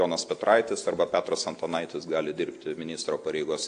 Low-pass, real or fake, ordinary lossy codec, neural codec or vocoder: 9.9 kHz; real; AAC, 48 kbps; none